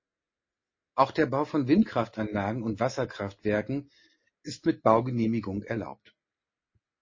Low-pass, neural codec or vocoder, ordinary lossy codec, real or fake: 7.2 kHz; none; MP3, 32 kbps; real